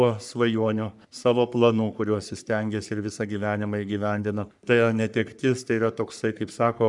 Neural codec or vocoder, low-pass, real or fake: codec, 44.1 kHz, 3.4 kbps, Pupu-Codec; 10.8 kHz; fake